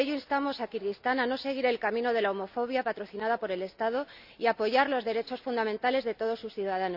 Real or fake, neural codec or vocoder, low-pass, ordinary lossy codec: real; none; 5.4 kHz; none